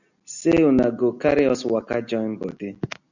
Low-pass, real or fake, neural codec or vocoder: 7.2 kHz; real; none